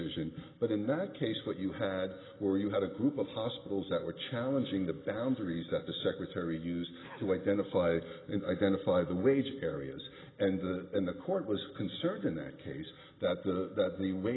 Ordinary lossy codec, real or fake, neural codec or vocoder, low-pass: AAC, 16 kbps; real; none; 7.2 kHz